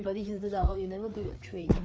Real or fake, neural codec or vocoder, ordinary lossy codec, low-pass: fake; codec, 16 kHz, 4 kbps, FreqCodec, larger model; none; none